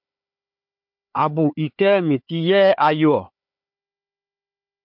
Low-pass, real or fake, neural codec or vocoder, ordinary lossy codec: 5.4 kHz; fake; codec, 16 kHz, 4 kbps, FunCodec, trained on Chinese and English, 50 frames a second; MP3, 48 kbps